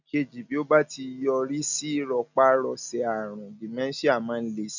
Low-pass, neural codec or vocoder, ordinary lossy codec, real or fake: 7.2 kHz; none; none; real